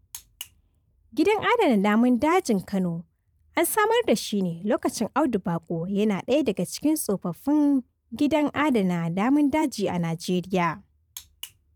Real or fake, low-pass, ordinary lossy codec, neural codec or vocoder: fake; 19.8 kHz; none; vocoder, 44.1 kHz, 128 mel bands every 512 samples, BigVGAN v2